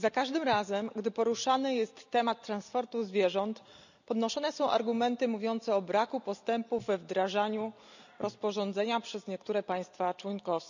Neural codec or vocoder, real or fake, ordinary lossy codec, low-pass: none; real; none; 7.2 kHz